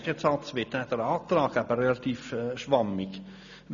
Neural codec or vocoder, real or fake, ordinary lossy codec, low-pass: none; real; none; 7.2 kHz